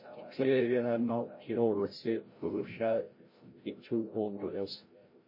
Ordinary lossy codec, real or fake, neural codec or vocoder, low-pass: MP3, 24 kbps; fake; codec, 16 kHz, 0.5 kbps, FreqCodec, larger model; 5.4 kHz